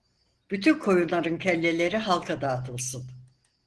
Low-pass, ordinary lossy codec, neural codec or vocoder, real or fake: 10.8 kHz; Opus, 16 kbps; none; real